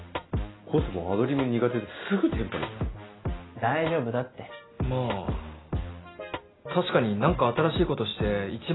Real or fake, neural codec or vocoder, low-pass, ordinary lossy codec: real; none; 7.2 kHz; AAC, 16 kbps